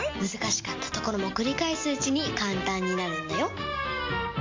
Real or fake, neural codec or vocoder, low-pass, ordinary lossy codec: real; none; 7.2 kHz; MP3, 48 kbps